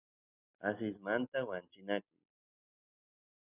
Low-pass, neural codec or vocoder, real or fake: 3.6 kHz; none; real